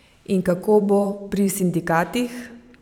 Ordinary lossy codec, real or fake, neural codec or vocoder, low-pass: none; fake; vocoder, 44.1 kHz, 128 mel bands every 512 samples, BigVGAN v2; 19.8 kHz